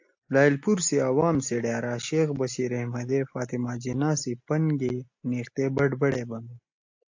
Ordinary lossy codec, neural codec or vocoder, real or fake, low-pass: AAC, 48 kbps; none; real; 7.2 kHz